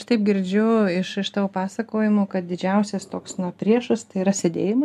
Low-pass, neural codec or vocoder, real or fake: 14.4 kHz; none; real